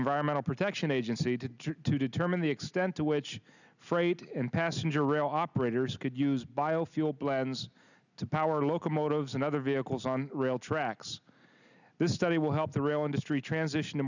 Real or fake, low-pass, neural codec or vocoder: real; 7.2 kHz; none